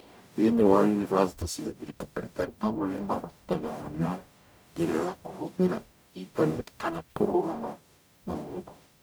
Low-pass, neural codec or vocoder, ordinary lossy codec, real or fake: none; codec, 44.1 kHz, 0.9 kbps, DAC; none; fake